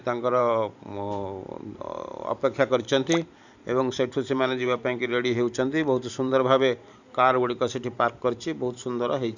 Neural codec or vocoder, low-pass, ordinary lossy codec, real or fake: vocoder, 44.1 kHz, 128 mel bands every 512 samples, BigVGAN v2; 7.2 kHz; none; fake